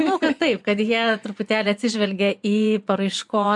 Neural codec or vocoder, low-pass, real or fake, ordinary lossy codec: vocoder, 24 kHz, 100 mel bands, Vocos; 10.8 kHz; fake; MP3, 64 kbps